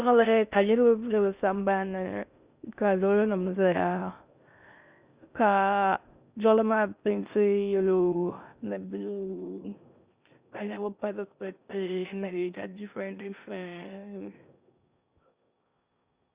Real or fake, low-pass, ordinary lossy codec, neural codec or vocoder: fake; 3.6 kHz; Opus, 64 kbps; codec, 16 kHz in and 24 kHz out, 0.6 kbps, FocalCodec, streaming, 4096 codes